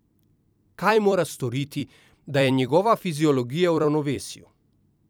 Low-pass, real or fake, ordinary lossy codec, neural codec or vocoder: none; fake; none; vocoder, 44.1 kHz, 128 mel bands every 256 samples, BigVGAN v2